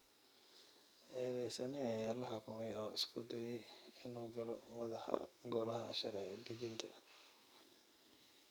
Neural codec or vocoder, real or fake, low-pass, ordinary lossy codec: codec, 44.1 kHz, 2.6 kbps, SNAC; fake; none; none